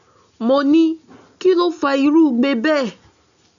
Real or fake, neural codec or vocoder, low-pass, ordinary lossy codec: real; none; 7.2 kHz; none